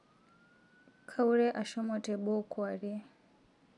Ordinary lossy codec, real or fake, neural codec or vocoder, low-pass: none; real; none; 10.8 kHz